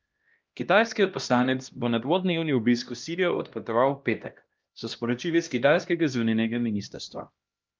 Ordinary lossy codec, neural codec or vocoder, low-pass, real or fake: Opus, 24 kbps; codec, 16 kHz, 1 kbps, X-Codec, HuBERT features, trained on LibriSpeech; 7.2 kHz; fake